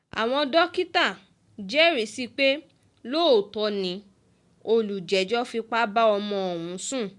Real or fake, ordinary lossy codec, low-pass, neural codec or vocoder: real; MP3, 64 kbps; 10.8 kHz; none